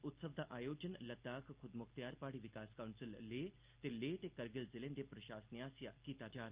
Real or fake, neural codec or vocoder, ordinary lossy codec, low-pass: real; none; Opus, 24 kbps; 3.6 kHz